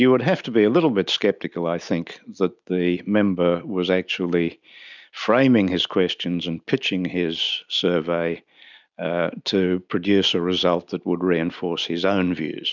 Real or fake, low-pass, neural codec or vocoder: real; 7.2 kHz; none